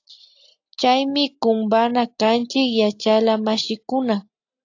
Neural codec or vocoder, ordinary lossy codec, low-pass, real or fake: none; AAC, 48 kbps; 7.2 kHz; real